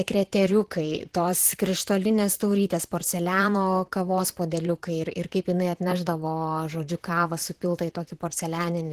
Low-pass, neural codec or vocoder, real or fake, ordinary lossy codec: 14.4 kHz; vocoder, 44.1 kHz, 128 mel bands, Pupu-Vocoder; fake; Opus, 16 kbps